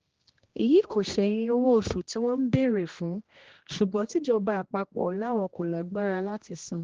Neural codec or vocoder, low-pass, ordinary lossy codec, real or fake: codec, 16 kHz, 1 kbps, X-Codec, HuBERT features, trained on general audio; 7.2 kHz; Opus, 16 kbps; fake